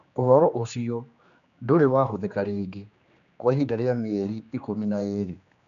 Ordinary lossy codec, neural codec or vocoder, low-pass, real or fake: none; codec, 16 kHz, 2 kbps, X-Codec, HuBERT features, trained on general audio; 7.2 kHz; fake